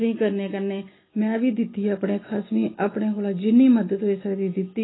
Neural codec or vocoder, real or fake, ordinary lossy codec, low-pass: none; real; AAC, 16 kbps; 7.2 kHz